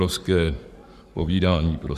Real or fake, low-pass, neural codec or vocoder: fake; 14.4 kHz; codec, 44.1 kHz, 7.8 kbps, Pupu-Codec